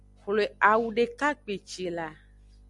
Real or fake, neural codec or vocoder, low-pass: real; none; 10.8 kHz